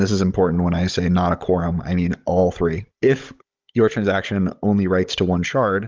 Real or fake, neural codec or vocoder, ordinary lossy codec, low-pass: fake; codec, 16 kHz, 16 kbps, FunCodec, trained on Chinese and English, 50 frames a second; Opus, 24 kbps; 7.2 kHz